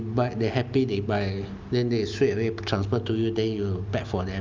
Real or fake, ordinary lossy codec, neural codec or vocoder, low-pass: real; Opus, 32 kbps; none; 7.2 kHz